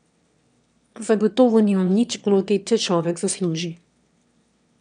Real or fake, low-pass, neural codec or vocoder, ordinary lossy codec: fake; 9.9 kHz; autoencoder, 22.05 kHz, a latent of 192 numbers a frame, VITS, trained on one speaker; none